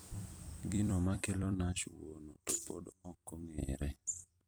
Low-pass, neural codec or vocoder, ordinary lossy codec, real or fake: none; vocoder, 44.1 kHz, 128 mel bands every 256 samples, BigVGAN v2; none; fake